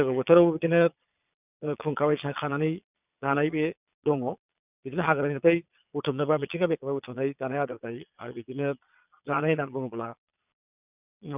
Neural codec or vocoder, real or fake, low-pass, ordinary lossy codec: vocoder, 22.05 kHz, 80 mel bands, Vocos; fake; 3.6 kHz; none